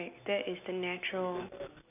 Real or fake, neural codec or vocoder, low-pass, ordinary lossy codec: real; none; 3.6 kHz; none